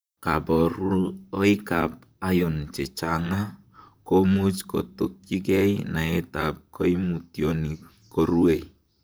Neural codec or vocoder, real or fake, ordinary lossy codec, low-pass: vocoder, 44.1 kHz, 128 mel bands, Pupu-Vocoder; fake; none; none